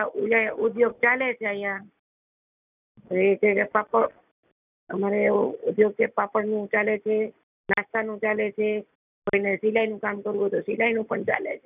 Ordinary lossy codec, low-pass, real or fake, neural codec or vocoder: none; 3.6 kHz; real; none